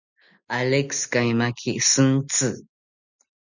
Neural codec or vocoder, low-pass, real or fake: none; 7.2 kHz; real